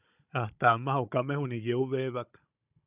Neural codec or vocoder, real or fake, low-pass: codec, 16 kHz, 16 kbps, FunCodec, trained on Chinese and English, 50 frames a second; fake; 3.6 kHz